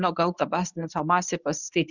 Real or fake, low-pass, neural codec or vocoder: fake; 7.2 kHz; codec, 24 kHz, 0.9 kbps, WavTokenizer, medium speech release version 1